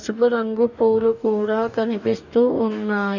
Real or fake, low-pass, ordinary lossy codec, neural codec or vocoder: fake; 7.2 kHz; none; codec, 44.1 kHz, 2.6 kbps, DAC